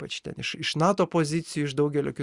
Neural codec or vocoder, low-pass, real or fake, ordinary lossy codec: none; 10.8 kHz; real; Opus, 64 kbps